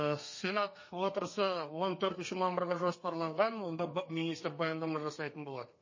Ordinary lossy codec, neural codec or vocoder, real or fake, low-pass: MP3, 32 kbps; codec, 32 kHz, 1.9 kbps, SNAC; fake; 7.2 kHz